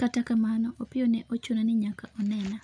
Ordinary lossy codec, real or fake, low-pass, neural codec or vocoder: none; real; 9.9 kHz; none